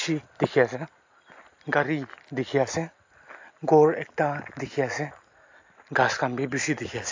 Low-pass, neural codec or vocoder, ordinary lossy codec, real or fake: 7.2 kHz; none; AAC, 48 kbps; real